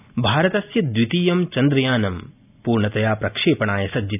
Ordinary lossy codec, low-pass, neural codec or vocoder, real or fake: none; 3.6 kHz; none; real